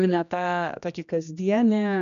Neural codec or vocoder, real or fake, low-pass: codec, 16 kHz, 1 kbps, X-Codec, HuBERT features, trained on general audio; fake; 7.2 kHz